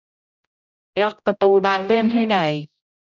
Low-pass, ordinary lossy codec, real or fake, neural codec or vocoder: 7.2 kHz; none; fake; codec, 16 kHz, 0.5 kbps, X-Codec, HuBERT features, trained on general audio